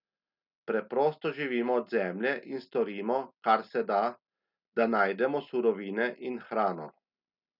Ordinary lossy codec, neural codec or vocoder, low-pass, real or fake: none; none; 5.4 kHz; real